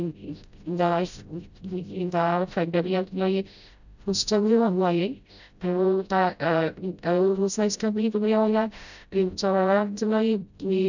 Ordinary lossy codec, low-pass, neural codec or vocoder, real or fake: none; 7.2 kHz; codec, 16 kHz, 0.5 kbps, FreqCodec, smaller model; fake